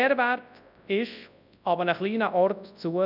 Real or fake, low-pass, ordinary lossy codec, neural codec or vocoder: fake; 5.4 kHz; none; codec, 24 kHz, 0.9 kbps, WavTokenizer, large speech release